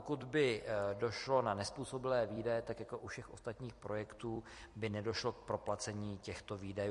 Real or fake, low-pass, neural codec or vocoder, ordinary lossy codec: fake; 14.4 kHz; vocoder, 44.1 kHz, 128 mel bands every 256 samples, BigVGAN v2; MP3, 48 kbps